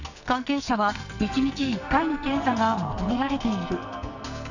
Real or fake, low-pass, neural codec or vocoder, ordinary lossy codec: fake; 7.2 kHz; codec, 44.1 kHz, 2.6 kbps, SNAC; none